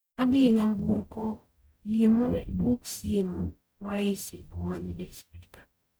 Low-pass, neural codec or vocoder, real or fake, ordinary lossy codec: none; codec, 44.1 kHz, 0.9 kbps, DAC; fake; none